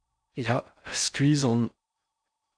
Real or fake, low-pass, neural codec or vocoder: fake; 9.9 kHz; codec, 16 kHz in and 24 kHz out, 0.6 kbps, FocalCodec, streaming, 4096 codes